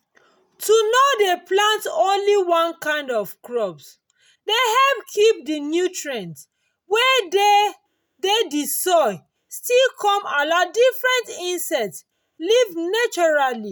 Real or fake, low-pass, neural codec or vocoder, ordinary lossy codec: real; none; none; none